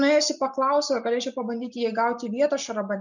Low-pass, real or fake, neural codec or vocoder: 7.2 kHz; real; none